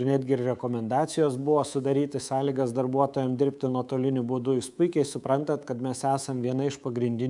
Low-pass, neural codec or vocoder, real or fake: 10.8 kHz; codec, 24 kHz, 3.1 kbps, DualCodec; fake